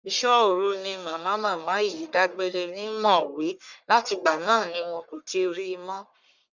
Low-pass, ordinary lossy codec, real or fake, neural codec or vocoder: 7.2 kHz; none; fake; codec, 44.1 kHz, 1.7 kbps, Pupu-Codec